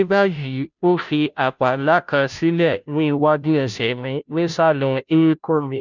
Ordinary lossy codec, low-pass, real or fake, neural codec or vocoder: none; 7.2 kHz; fake; codec, 16 kHz, 0.5 kbps, FunCodec, trained on Chinese and English, 25 frames a second